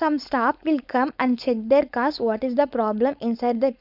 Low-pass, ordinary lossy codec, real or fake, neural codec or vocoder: 5.4 kHz; none; fake; codec, 16 kHz, 4.8 kbps, FACodec